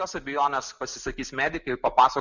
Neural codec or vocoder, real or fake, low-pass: none; real; 7.2 kHz